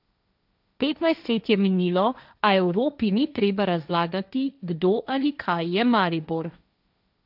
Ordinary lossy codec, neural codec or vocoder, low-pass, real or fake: none; codec, 16 kHz, 1.1 kbps, Voila-Tokenizer; 5.4 kHz; fake